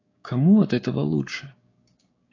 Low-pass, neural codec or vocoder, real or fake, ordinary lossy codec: 7.2 kHz; codec, 16 kHz, 6 kbps, DAC; fake; AAC, 32 kbps